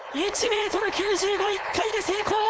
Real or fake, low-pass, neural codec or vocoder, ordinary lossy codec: fake; none; codec, 16 kHz, 4.8 kbps, FACodec; none